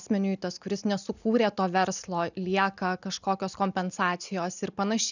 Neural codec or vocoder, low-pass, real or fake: none; 7.2 kHz; real